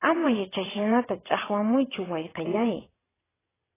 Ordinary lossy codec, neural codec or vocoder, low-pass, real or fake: AAC, 16 kbps; vocoder, 22.05 kHz, 80 mel bands, WaveNeXt; 3.6 kHz; fake